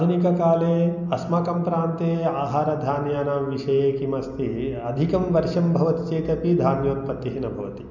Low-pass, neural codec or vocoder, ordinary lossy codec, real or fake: 7.2 kHz; none; none; real